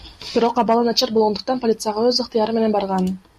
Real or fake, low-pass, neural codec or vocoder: real; 14.4 kHz; none